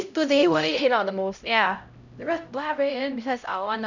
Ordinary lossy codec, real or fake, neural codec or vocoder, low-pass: none; fake; codec, 16 kHz, 0.5 kbps, X-Codec, HuBERT features, trained on LibriSpeech; 7.2 kHz